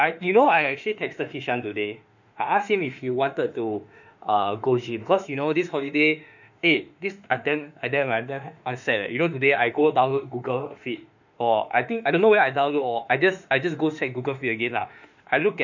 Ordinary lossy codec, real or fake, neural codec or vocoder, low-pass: none; fake; autoencoder, 48 kHz, 32 numbers a frame, DAC-VAE, trained on Japanese speech; 7.2 kHz